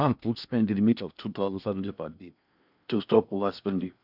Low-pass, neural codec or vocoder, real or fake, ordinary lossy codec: 5.4 kHz; codec, 16 kHz in and 24 kHz out, 0.8 kbps, FocalCodec, streaming, 65536 codes; fake; none